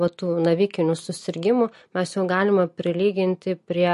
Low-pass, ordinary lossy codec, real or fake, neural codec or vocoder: 14.4 kHz; MP3, 48 kbps; real; none